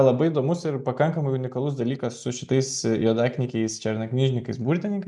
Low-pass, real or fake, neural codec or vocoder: 10.8 kHz; real; none